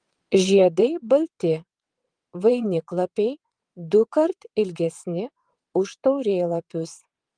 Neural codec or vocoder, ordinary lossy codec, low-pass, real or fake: vocoder, 22.05 kHz, 80 mel bands, WaveNeXt; Opus, 24 kbps; 9.9 kHz; fake